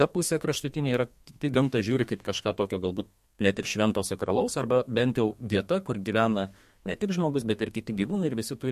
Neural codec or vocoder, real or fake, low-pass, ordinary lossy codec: codec, 32 kHz, 1.9 kbps, SNAC; fake; 14.4 kHz; MP3, 64 kbps